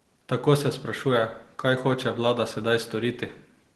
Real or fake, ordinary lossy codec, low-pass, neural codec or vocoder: real; Opus, 16 kbps; 10.8 kHz; none